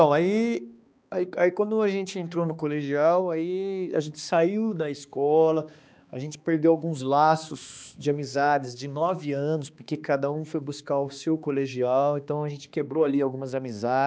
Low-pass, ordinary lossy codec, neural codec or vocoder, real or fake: none; none; codec, 16 kHz, 2 kbps, X-Codec, HuBERT features, trained on balanced general audio; fake